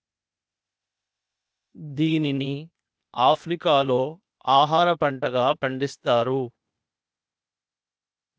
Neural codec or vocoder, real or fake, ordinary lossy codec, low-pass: codec, 16 kHz, 0.8 kbps, ZipCodec; fake; none; none